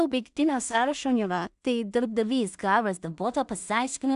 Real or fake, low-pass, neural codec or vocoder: fake; 10.8 kHz; codec, 16 kHz in and 24 kHz out, 0.4 kbps, LongCat-Audio-Codec, two codebook decoder